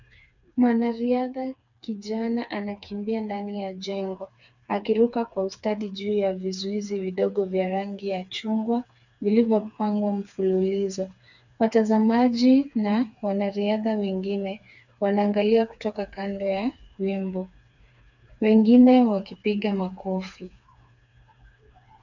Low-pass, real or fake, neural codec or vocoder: 7.2 kHz; fake; codec, 16 kHz, 4 kbps, FreqCodec, smaller model